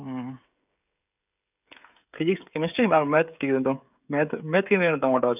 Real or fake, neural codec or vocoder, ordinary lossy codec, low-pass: fake; codec, 16 kHz, 16 kbps, FreqCodec, smaller model; none; 3.6 kHz